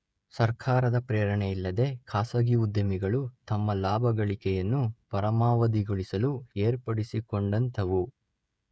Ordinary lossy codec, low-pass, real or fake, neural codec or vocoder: none; none; fake; codec, 16 kHz, 16 kbps, FreqCodec, smaller model